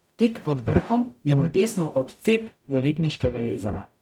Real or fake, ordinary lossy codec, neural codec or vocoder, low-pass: fake; none; codec, 44.1 kHz, 0.9 kbps, DAC; 19.8 kHz